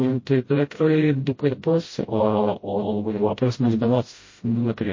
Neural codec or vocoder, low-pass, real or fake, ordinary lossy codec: codec, 16 kHz, 0.5 kbps, FreqCodec, smaller model; 7.2 kHz; fake; MP3, 32 kbps